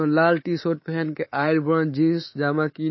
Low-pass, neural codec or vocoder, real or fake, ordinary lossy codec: 7.2 kHz; codec, 24 kHz, 3.1 kbps, DualCodec; fake; MP3, 24 kbps